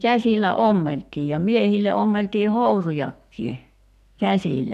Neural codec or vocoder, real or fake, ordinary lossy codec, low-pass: codec, 44.1 kHz, 2.6 kbps, SNAC; fake; none; 14.4 kHz